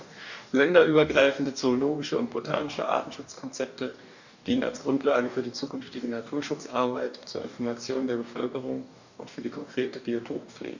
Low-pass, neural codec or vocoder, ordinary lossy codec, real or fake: 7.2 kHz; codec, 44.1 kHz, 2.6 kbps, DAC; none; fake